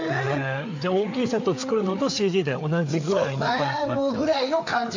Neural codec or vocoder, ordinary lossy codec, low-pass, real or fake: codec, 16 kHz, 4 kbps, FreqCodec, larger model; AAC, 48 kbps; 7.2 kHz; fake